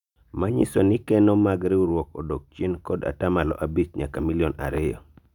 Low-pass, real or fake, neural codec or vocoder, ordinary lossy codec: 19.8 kHz; real; none; none